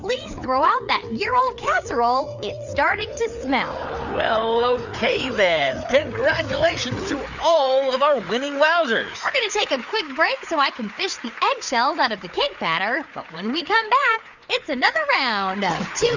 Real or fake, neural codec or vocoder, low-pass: fake; codec, 16 kHz, 4 kbps, FreqCodec, larger model; 7.2 kHz